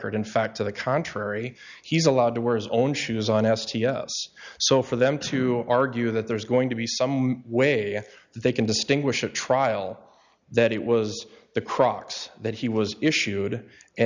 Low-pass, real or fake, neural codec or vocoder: 7.2 kHz; real; none